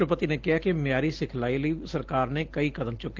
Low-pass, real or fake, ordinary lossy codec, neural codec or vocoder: 7.2 kHz; real; Opus, 16 kbps; none